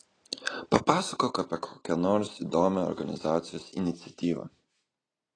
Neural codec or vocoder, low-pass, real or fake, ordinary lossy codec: none; 9.9 kHz; real; AAC, 32 kbps